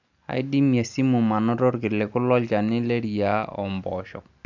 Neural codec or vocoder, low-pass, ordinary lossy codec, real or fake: none; 7.2 kHz; none; real